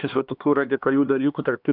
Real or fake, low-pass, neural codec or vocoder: fake; 5.4 kHz; codec, 16 kHz, 2 kbps, X-Codec, HuBERT features, trained on LibriSpeech